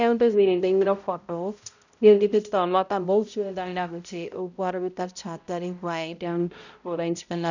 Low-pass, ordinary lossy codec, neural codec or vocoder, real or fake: 7.2 kHz; none; codec, 16 kHz, 0.5 kbps, X-Codec, HuBERT features, trained on balanced general audio; fake